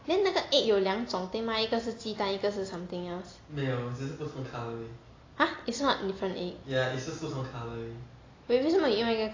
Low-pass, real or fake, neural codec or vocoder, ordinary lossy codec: 7.2 kHz; real; none; AAC, 32 kbps